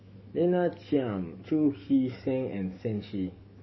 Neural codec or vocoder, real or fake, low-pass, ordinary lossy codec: codec, 44.1 kHz, 7.8 kbps, DAC; fake; 7.2 kHz; MP3, 24 kbps